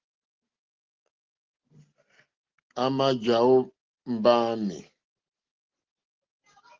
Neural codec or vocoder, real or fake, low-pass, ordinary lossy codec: none; real; 7.2 kHz; Opus, 16 kbps